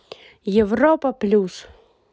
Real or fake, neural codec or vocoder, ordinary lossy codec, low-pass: real; none; none; none